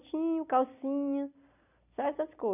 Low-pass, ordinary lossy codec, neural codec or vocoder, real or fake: 3.6 kHz; none; none; real